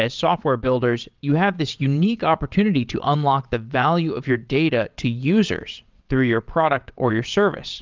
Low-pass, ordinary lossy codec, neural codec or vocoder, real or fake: 7.2 kHz; Opus, 24 kbps; vocoder, 22.05 kHz, 80 mel bands, WaveNeXt; fake